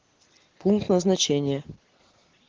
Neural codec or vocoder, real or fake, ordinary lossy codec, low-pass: none; real; Opus, 16 kbps; 7.2 kHz